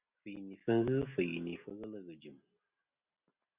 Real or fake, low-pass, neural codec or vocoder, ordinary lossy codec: real; 3.6 kHz; none; Opus, 64 kbps